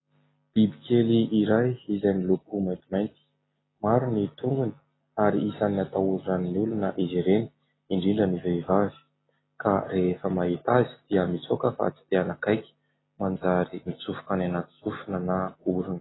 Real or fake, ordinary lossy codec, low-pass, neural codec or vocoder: real; AAC, 16 kbps; 7.2 kHz; none